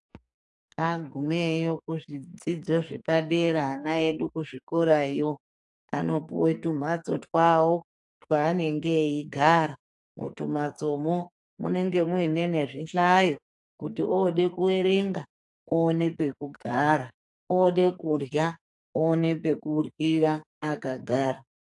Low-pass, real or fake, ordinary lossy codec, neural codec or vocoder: 10.8 kHz; fake; AAC, 64 kbps; codec, 44.1 kHz, 2.6 kbps, SNAC